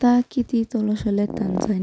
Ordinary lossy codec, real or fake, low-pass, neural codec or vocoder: none; real; none; none